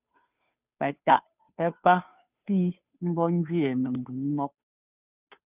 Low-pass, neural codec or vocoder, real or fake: 3.6 kHz; codec, 16 kHz, 2 kbps, FunCodec, trained on Chinese and English, 25 frames a second; fake